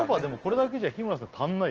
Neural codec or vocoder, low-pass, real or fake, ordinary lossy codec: none; 7.2 kHz; real; Opus, 16 kbps